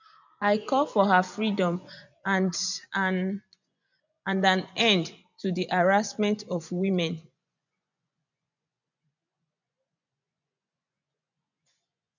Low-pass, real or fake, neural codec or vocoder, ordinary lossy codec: 7.2 kHz; fake; vocoder, 24 kHz, 100 mel bands, Vocos; none